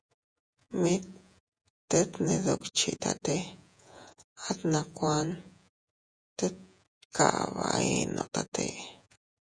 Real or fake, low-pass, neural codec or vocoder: fake; 9.9 kHz; vocoder, 48 kHz, 128 mel bands, Vocos